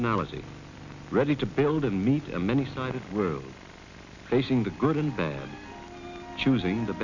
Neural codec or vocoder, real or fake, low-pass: none; real; 7.2 kHz